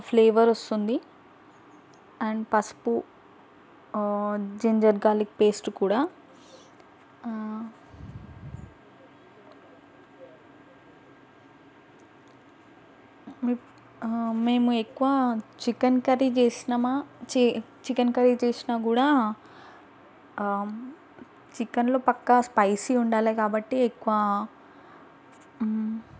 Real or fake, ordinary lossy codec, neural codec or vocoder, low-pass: real; none; none; none